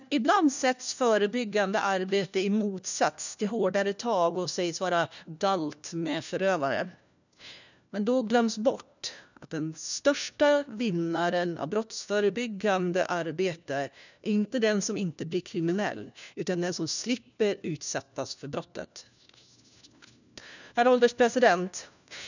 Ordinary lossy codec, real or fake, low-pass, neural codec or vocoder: none; fake; 7.2 kHz; codec, 16 kHz, 1 kbps, FunCodec, trained on LibriTTS, 50 frames a second